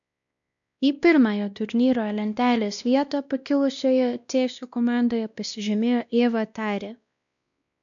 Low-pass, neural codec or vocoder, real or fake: 7.2 kHz; codec, 16 kHz, 1 kbps, X-Codec, WavLM features, trained on Multilingual LibriSpeech; fake